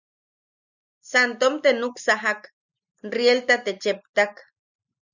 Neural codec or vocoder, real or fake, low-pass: none; real; 7.2 kHz